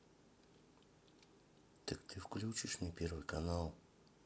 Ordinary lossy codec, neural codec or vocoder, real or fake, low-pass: none; none; real; none